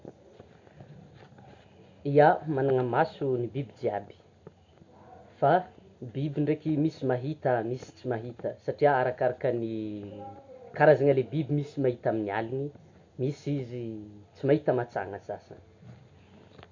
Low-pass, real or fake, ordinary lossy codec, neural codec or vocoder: 7.2 kHz; real; MP3, 48 kbps; none